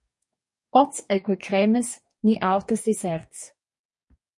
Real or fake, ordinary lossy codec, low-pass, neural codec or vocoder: fake; MP3, 48 kbps; 10.8 kHz; codec, 44.1 kHz, 2.6 kbps, DAC